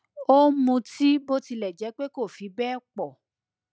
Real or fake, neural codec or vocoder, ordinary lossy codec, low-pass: real; none; none; none